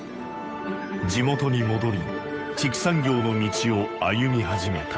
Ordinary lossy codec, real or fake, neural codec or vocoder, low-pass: none; fake; codec, 16 kHz, 8 kbps, FunCodec, trained on Chinese and English, 25 frames a second; none